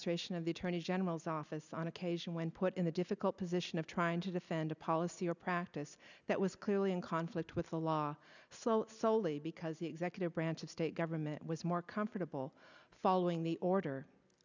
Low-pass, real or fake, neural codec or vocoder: 7.2 kHz; real; none